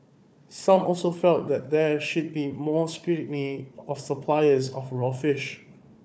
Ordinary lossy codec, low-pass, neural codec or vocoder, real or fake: none; none; codec, 16 kHz, 4 kbps, FunCodec, trained on Chinese and English, 50 frames a second; fake